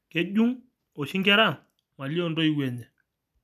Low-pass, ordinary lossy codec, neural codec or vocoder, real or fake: 14.4 kHz; none; none; real